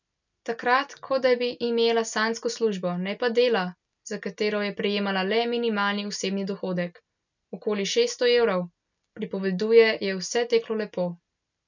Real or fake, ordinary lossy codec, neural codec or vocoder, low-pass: real; none; none; 7.2 kHz